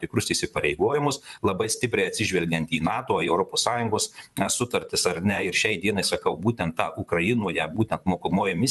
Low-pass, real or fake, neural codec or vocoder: 14.4 kHz; fake; vocoder, 44.1 kHz, 128 mel bands, Pupu-Vocoder